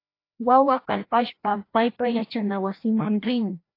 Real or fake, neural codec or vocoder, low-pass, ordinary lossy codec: fake; codec, 16 kHz, 1 kbps, FreqCodec, larger model; 5.4 kHz; AAC, 32 kbps